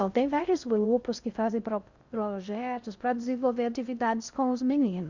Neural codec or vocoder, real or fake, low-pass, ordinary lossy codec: codec, 16 kHz in and 24 kHz out, 0.6 kbps, FocalCodec, streaming, 2048 codes; fake; 7.2 kHz; Opus, 64 kbps